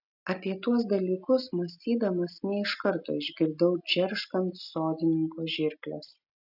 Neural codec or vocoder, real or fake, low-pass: none; real; 5.4 kHz